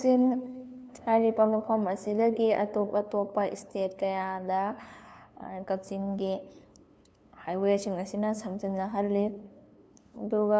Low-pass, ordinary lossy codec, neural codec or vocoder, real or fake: none; none; codec, 16 kHz, 2 kbps, FunCodec, trained on LibriTTS, 25 frames a second; fake